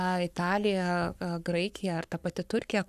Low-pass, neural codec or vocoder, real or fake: 14.4 kHz; codec, 44.1 kHz, 7.8 kbps, Pupu-Codec; fake